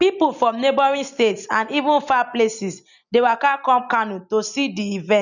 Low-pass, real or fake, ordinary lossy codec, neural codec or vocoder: 7.2 kHz; real; none; none